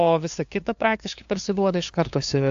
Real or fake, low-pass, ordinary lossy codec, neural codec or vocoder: fake; 7.2 kHz; AAC, 64 kbps; codec, 16 kHz, 2 kbps, FunCodec, trained on LibriTTS, 25 frames a second